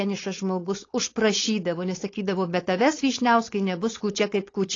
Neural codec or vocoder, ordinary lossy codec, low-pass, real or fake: codec, 16 kHz, 4.8 kbps, FACodec; AAC, 32 kbps; 7.2 kHz; fake